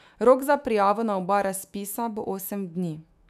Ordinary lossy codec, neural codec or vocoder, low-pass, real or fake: none; autoencoder, 48 kHz, 128 numbers a frame, DAC-VAE, trained on Japanese speech; 14.4 kHz; fake